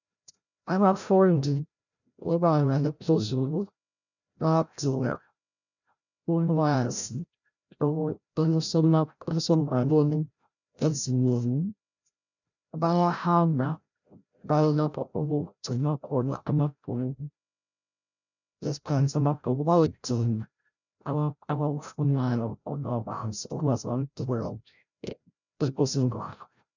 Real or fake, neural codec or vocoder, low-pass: fake; codec, 16 kHz, 0.5 kbps, FreqCodec, larger model; 7.2 kHz